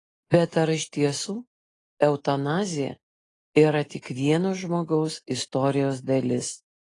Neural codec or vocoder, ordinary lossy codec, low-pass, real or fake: none; AAC, 32 kbps; 10.8 kHz; real